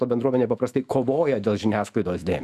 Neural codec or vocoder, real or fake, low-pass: autoencoder, 48 kHz, 128 numbers a frame, DAC-VAE, trained on Japanese speech; fake; 14.4 kHz